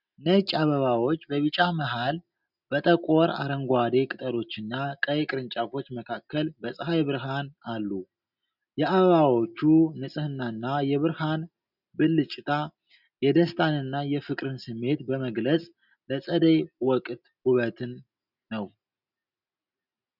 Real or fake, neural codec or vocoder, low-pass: real; none; 5.4 kHz